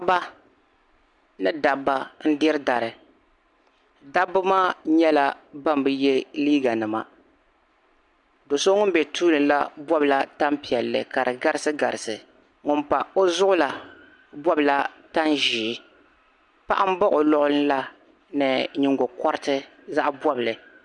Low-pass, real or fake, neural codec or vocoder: 10.8 kHz; real; none